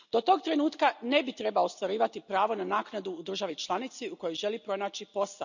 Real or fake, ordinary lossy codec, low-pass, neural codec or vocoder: real; none; 7.2 kHz; none